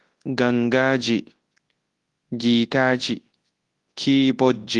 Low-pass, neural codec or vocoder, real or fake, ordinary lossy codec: 10.8 kHz; codec, 24 kHz, 0.9 kbps, WavTokenizer, large speech release; fake; Opus, 24 kbps